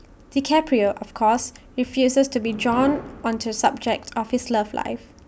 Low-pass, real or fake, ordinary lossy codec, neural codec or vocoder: none; real; none; none